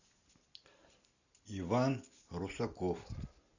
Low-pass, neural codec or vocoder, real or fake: 7.2 kHz; none; real